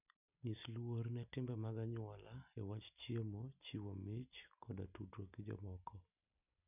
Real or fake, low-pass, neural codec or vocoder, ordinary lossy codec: real; 3.6 kHz; none; none